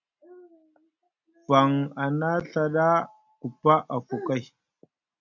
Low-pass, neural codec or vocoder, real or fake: 7.2 kHz; none; real